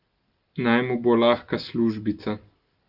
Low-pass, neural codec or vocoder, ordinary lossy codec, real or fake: 5.4 kHz; none; Opus, 24 kbps; real